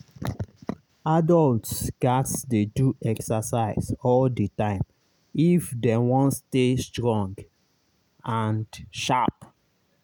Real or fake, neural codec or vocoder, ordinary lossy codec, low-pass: real; none; none; none